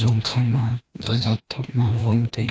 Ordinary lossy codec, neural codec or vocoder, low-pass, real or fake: none; codec, 16 kHz, 1 kbps, FreqCodec, larger model; none; fake